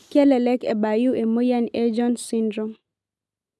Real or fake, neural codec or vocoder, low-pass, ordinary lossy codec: real; none; none; none